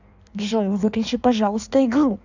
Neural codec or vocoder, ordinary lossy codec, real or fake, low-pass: codec, 16 kHz in and 24 kHz out, 1.1 kbps, FireRedTTS-2 codec; none; fake; 7.2 kHz